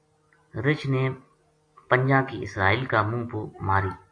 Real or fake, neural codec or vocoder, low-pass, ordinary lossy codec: real; none; 9.9 kHz; AAC, 48 kbps